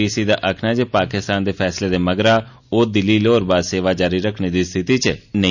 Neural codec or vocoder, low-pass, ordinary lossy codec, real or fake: none; 7.2 kHz; none; real